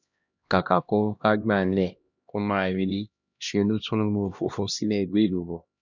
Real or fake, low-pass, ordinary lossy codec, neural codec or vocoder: fake; 7.2 kHz; none; codec, 16 kHz, 1 kbps, X-Codec, HuBERT features, trained on LibriSpeech